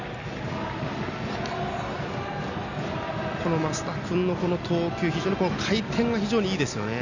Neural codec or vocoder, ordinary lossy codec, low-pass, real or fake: none; none; 7.2 kHz; real